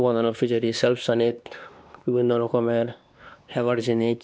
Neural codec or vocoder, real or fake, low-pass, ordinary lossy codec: codec, 16 kHz, 2 kbps, X-Codec, WavLM features, trained on Multilingual LibriSpeech; fake; none; none